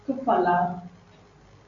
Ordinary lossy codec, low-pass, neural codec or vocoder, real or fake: AAC, 64 kbps; 7.2 kHz; none; real